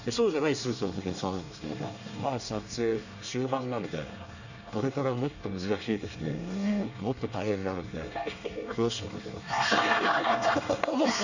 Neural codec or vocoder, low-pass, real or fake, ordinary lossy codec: codec, 24 kHz, 1 kbps, SNAC; 7.2 kHz; fake; none